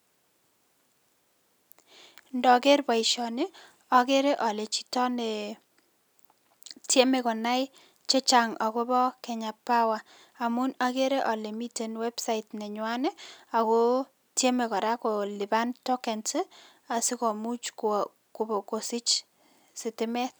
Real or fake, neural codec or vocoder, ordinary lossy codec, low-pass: real; none; none; none